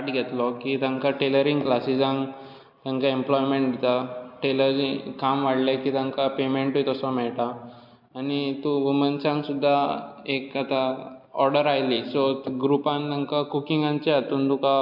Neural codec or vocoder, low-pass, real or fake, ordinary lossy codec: none; 5.4 kHz; real; MP3, 48 kbps